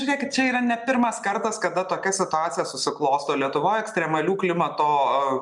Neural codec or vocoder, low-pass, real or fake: none; 10.8 kHz; real